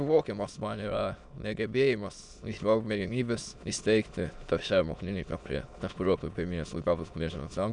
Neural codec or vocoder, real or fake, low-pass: autoencoder, 22.05 kHz, a latent of 192 numbers a frame, VITS, trained on many speakers; fake; 9.9 kHz